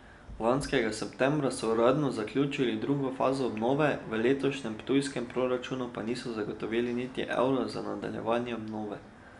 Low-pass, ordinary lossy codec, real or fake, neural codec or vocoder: 10.8 kHz; none; real; none